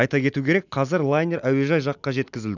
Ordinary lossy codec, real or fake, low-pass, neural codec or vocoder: none; real; 7.2 kHz; none